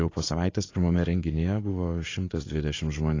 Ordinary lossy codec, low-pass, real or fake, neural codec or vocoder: AAC, 32 kbps; 7.2 kHz; fake; vocoder, 44.1 kHz, 80 mel bands, Vocos